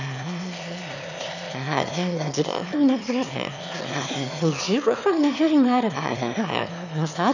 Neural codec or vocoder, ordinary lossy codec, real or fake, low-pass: autoencoder, 22.05 kHz, a latent of 192 numbers a frame, VITS, trained on one speaker; none; fake; 7.2 kHz